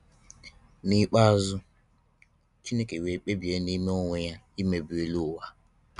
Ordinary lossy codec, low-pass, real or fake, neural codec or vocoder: none; 10.8 kHz; real; none